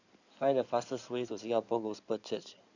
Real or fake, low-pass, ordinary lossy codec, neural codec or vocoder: fake; 7.2 kHz; none; codec, 16 kHz in and 24 kHz out, 2.2 kbps, FireRedTTS-2 codec